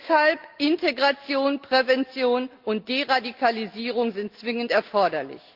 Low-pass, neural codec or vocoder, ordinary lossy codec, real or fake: 5.4 kHz; none; Opus, 32 kbps; real